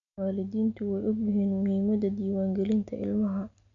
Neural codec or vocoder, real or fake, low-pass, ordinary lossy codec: none; real; 7.2 kHz; none